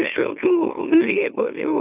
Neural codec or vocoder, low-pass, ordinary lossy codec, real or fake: autoencoder, 44.1 kHz, a latent of 192 numbers a frame, MeloTTS; 3.6 kHz; AAC, 32 kbps; fake